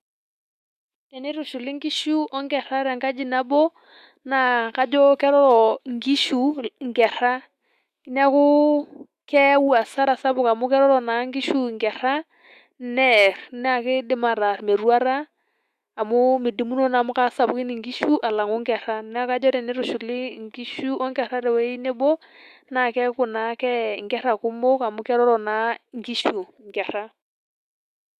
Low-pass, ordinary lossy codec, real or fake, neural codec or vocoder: 14.4 kHz; Opus, 64 kbps; fake; autoencoder, 48 kHz, 128 numbers a frame, DAC-VAE, trained on Japanese speech